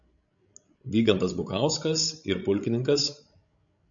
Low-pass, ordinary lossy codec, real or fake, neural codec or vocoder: 7.2 kHz; MP3, 64 kbps; fake; codec, 16 kHz, 16 kbps, FreqCodec, larger model